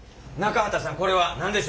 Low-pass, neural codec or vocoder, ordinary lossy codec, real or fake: none; none; none; real